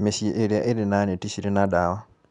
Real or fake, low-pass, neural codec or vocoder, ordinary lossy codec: real; 10.8 kHz; none; none